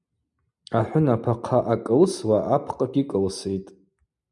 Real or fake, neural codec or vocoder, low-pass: real; none; 10.8 kHz